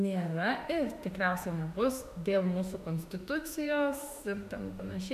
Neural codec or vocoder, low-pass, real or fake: autoencoder, 48 kHz, 32 numbers a frame, DAC-VAE, trained on Japanese speech; 14.4 kHz; fake